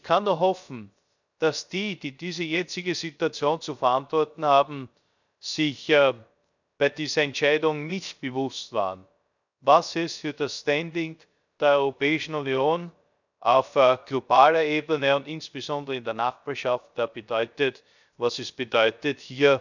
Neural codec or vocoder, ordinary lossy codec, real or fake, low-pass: codec, 16 kHz, 0.3 kbps, FocalCodec; none; fake; 7.2 kHz